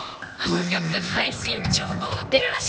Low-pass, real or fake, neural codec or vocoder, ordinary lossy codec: none; fake; codec, 16 kHz, 0.8 kbps, ZipCodec; none